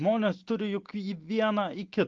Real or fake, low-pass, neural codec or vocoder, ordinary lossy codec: real; 7.2 kHz; none; Opus, 16 kbps